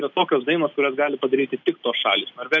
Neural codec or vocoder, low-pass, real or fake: none; 7.2 kHz; real